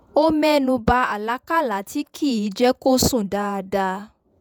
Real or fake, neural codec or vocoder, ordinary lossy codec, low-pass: fake; vocoder, 48 kHz, 128 mel bands, Vocos; none; none